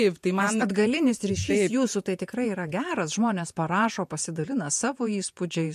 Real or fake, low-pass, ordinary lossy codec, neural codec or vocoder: fake; 14.4 kHz; MP3, 64 kbps; vocoder, 48 kHz, 128 mel bands, Vocos